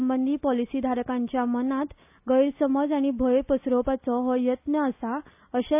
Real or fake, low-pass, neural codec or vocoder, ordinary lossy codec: real; 3.6 kHz; none; AAC, 32 kbps